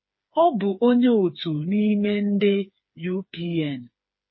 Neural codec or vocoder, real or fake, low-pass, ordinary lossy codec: codec, 16 kHz, 4 kbps, FreqCodec, smaller model; fake; 7.2 kHz; MP3, 24 kbps